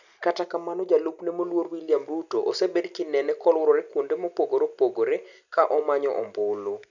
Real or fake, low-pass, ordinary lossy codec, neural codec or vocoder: real; 7.2 kHz; none; none